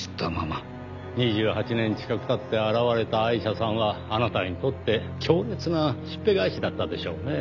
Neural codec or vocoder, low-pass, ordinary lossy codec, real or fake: none; 7.2 kHz; none; real